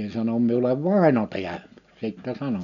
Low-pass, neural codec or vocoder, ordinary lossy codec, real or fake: 7.2 kHz; none; none; real